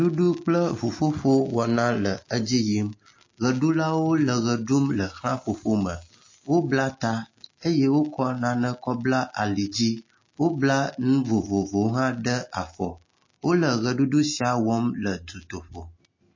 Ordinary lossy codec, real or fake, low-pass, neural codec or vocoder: MP3, 32 kbps; real; 7.2 kHz; none